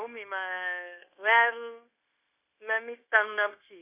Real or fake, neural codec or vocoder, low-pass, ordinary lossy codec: fake; codec, 16 kHz, 0.9 kbps, LongCat-Audio-Codec; 3.6 kHz; Opus, 64 kbps